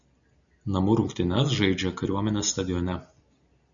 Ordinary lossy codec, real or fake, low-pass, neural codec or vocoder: MP3, 96 kbps; real; 7.2 kHz; none